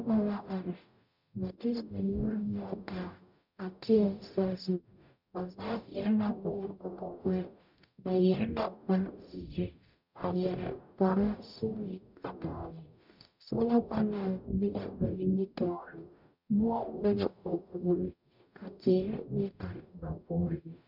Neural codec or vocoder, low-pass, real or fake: codec, 44.1 kHz, 0.9 kbps, DAC; 5.4 kHz; fake